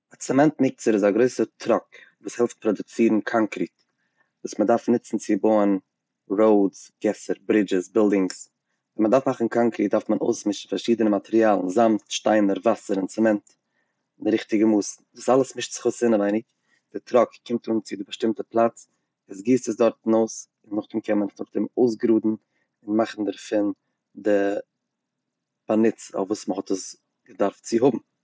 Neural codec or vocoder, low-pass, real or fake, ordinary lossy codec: none; none; real; none